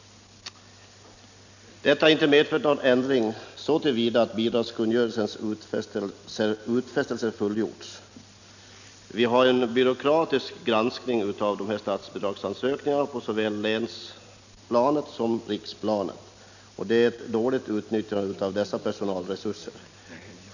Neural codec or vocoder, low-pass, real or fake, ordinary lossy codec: none; 7.2 kHz; real; none